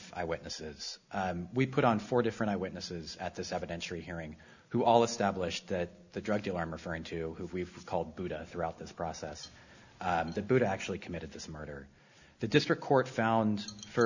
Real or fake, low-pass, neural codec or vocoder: real; 7.2 kHz; none